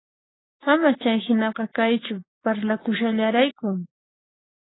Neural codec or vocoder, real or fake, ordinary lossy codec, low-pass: codec, 16 kHz, 6 kbps, DAC; fake; AAC, 16 kbps; 7.2 kHz